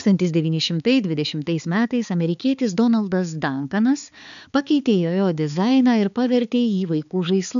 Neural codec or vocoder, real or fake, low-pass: codec, 16 kHz, 4 kbps, X-Codec, HuBERT features, trained on balanced general audio; fake; 7.2 kHz